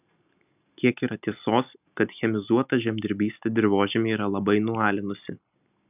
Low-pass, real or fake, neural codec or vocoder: 3.6 kHz; real; none